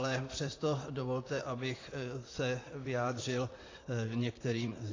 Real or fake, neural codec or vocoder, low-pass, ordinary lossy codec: fake; vocoder, 44.1 kHz, 128 mel bands, Pupu-Vocoder; 7.2 kHz; AAC, 32 kbps